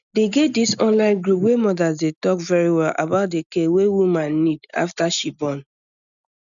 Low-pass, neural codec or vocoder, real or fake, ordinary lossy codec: 7.2 kHz; none; real; none